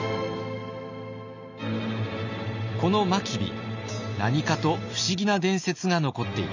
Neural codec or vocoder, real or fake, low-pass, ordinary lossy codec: none; real; 7.2 kHz; none